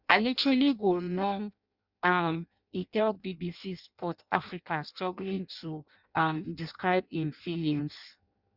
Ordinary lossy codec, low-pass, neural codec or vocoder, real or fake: Opus, 64 kbps; 5.4 kHz; codec, 16 kHz in and 24 kHz out, 0.6 kbps, FireRedTTS-2 codec; fake